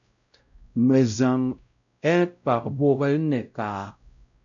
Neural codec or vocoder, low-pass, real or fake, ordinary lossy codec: codec, 16 kHz, 0.5 kbps, X-Codec, WavLM features, trained on Multilingual LibriSpeech; 7.2 kHz; fake; MP3, 96 kbps